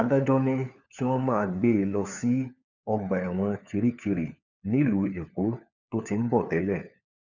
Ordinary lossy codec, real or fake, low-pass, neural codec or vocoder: none; fake; 7.2 kHz; codec, 16 kHz, 4 kbps, FunCodec, trained on LibriTTS, 50 frames a second